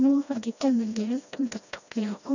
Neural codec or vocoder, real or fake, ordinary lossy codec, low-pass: codec, 16 kHz, 1 kbps, FreqCodec, smaller model; fake; none; 7.2 kHz